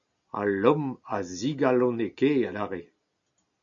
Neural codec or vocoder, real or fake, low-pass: none; real; 7.2 kHz